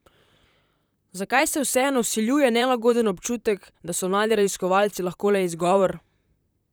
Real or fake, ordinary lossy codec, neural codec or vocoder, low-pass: fake; none; vocoder, 44.1 kHz, 128 mel bands, Pupu-Vocoder; none